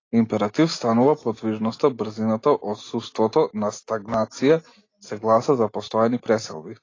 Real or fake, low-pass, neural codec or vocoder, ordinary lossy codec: real; 7.2 kHz; none; AAC, 32 kbps